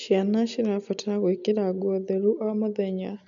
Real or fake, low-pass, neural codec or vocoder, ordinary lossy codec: real; 7.2 kHz; none; none